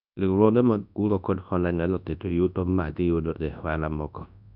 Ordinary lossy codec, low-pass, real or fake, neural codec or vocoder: none; 5.4 kHz; fake; codec, 24 kHz, 0.9 kbps, WavTokenizer, large speech release